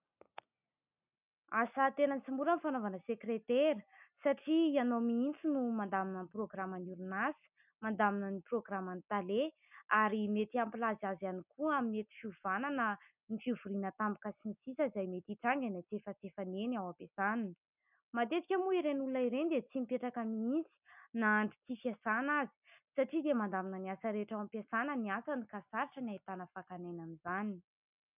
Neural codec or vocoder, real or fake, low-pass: none; real; 3.6 kHz